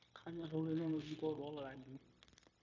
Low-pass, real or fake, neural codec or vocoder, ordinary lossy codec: 7.2 kHz; fake; codec, 16 kHz, 0.9 kbps, LongCat-Audio-Codec; MP3, 64 kbps